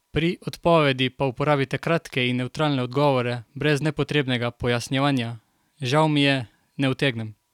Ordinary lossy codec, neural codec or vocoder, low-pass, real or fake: none; none; 19.8 kHz; real